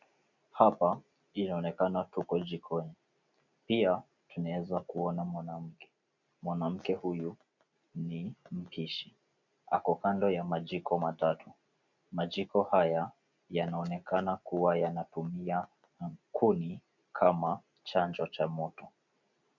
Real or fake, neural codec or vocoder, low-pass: real; none; 7.2 kHz